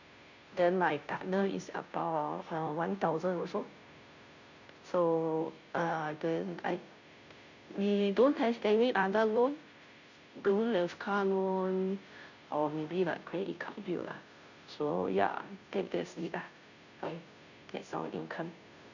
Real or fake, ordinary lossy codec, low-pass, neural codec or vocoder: fake; none; 7.2 kHz; codec, 16 kHz, 0.5 kbps, FunCodec, trained on Chinese and English, 25 frames a second